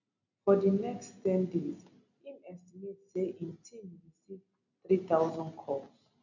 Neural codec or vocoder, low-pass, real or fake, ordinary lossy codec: none; 7.2 kHz; real; none